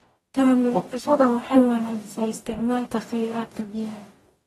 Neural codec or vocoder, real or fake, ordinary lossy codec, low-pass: codec, 44.1 kHz, 0.9 kbps, DAC; fake; AAC, 32 kbps; 19.8 kHz